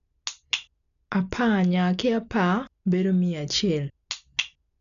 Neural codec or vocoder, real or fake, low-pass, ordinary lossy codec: none; real; 7.2 kHz; none